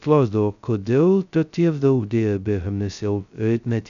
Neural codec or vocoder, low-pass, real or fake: codec, 16 kHz, 0.2 kbps, FocalCodec; 7.2 kHz; fake